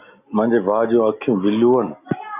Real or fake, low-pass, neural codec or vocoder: real; 3.6 kHz; none